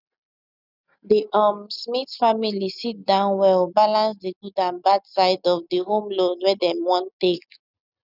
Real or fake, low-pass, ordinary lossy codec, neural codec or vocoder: real; 5.4 kHz; none; none